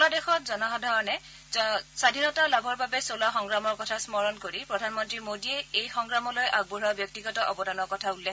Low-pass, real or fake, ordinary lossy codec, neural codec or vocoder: none; real; none; none